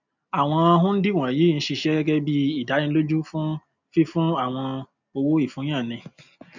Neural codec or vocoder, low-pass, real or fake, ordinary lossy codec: none; 7.2 kHz; real; none